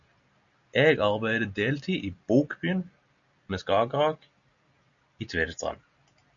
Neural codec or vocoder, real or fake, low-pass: none; real; 7.2 kHz